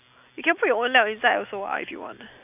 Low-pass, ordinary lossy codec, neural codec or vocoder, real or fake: 3.6 kHz; none; none; real